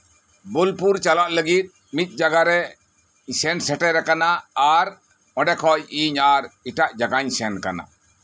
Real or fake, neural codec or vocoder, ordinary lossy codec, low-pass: real; none; none; none